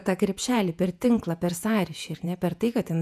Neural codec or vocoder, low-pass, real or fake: vocoder, 48 kHz, 128 mel bands, Vocos; 14.4 kHz; fake